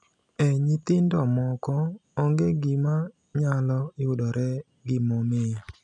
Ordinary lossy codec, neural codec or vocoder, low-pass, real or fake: none; none; 10.8 kHz; real